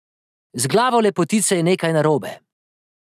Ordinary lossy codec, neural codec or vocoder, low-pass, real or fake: none; none; 14.4 kHz; real